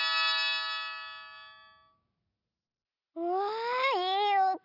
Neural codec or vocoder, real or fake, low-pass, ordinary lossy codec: none; real; 5.4 kHz; none